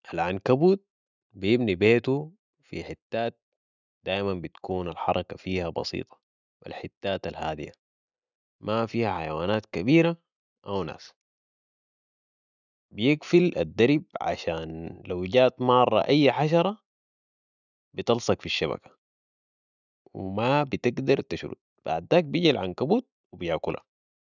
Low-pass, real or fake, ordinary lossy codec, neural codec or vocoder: 7.2 kHz; real; none; none